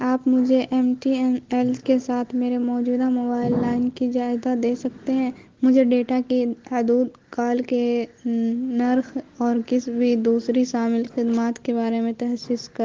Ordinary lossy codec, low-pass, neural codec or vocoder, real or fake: Opus, 16 kbps; 7.2 kHz; none; real